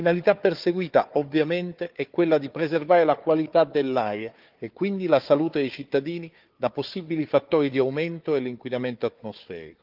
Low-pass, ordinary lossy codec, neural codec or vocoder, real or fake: 5.4 kHz; Opus, 32 kbps; codec, 16 kHz, 4 kbps, FunCodec, trained on Chinese and English, 50 frames a second; fake